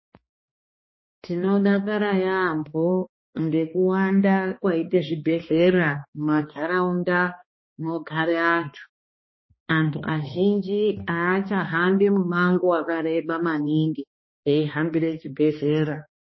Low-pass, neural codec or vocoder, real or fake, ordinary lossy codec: 7.2 kHz; codec, 16 kHz, 2 kbps, X-Codec, HuBERT features, trained on balanced general audio; fake; MP3, 24 kbps